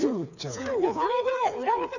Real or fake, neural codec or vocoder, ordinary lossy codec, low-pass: fake; codec, 16 kHz, 4 kbps, FreqCodec, smaller model; none; 7.2 kHz